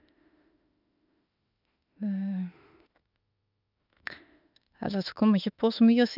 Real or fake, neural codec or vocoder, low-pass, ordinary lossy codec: fake; autoencoder, 48 kHz, 32 numbers a frame, DAC-VAE, trained on Japanese speech; 5.4 kHz; none